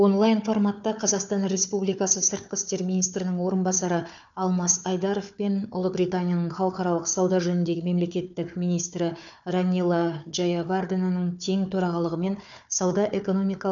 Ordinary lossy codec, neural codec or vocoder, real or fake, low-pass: none; codec, 16 kHz, 4 kbps, FunCodec, trained on Chinese and English, 50 frames a second; fake; 7.2 kHz